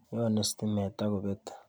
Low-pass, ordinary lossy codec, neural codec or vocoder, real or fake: none; none; none; real